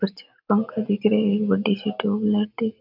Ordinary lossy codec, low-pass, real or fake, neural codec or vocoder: none; 5.4 kHz; real; none